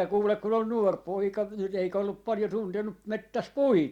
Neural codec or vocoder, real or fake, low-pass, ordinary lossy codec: none; real; 19.8 kHz; Opus, 64 kbps